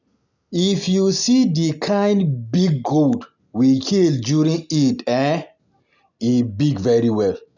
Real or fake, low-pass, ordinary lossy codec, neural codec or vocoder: real; 7.2 kHz; none; none